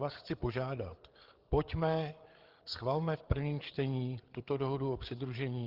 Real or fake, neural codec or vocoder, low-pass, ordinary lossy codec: fake; codec, 16 kHz, 8 kbps, FreqCodec, larger model; 5.4 kHz; Opus, 16 kbps